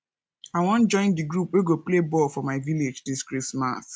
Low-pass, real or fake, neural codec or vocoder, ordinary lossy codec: none; real; none; none